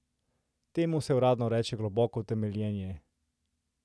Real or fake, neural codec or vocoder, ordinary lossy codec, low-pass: real; none; none; none